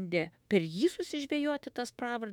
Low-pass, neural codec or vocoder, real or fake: 19.8 kHz; autoencoder, 48 kHz, 32 numbers a frame, DAC-VAE, trained on Japanese speech; fake